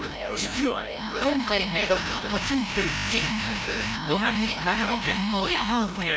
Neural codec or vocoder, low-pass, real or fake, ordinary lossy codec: codec, 16 kHz, 0.5 kbps, FreqCodec, larger model; none; fake; none